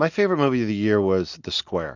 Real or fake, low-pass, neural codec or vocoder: real; 7.2 kHz; none